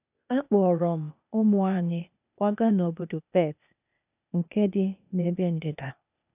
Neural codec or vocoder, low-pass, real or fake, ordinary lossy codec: codec, 16 kHz, 0.8 kbps, ZipCodec; 3.6 kHz; fake; none